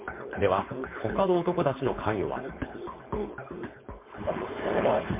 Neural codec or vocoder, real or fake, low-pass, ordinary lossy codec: codec, 16 kHz, 4.8 kbps, FACodec; fake; 3.6 kHz; MP3, 24 kbps